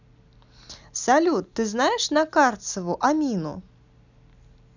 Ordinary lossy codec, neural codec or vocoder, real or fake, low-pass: none; none; real; 7.2 kHz